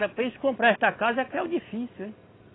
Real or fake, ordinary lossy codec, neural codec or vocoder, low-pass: real; AAC, 16 kbps; none; 7.2 kHz